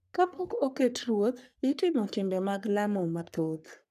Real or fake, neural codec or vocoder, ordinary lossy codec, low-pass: fake; codec, 44.1 kHz, 3.4 kbps, Pupu-Codec; none; 14.4 kHz